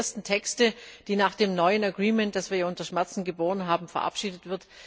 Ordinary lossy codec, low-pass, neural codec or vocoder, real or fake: none; none; none; real